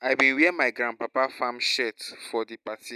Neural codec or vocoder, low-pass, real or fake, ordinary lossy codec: none; 14.4 kHz; real; none